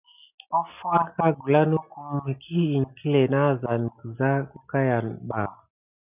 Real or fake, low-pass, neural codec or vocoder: real; 3.6 kHz; none